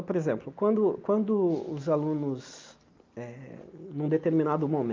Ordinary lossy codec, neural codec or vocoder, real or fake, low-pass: Opus, 24 kbps; none; real; 7.2 kHz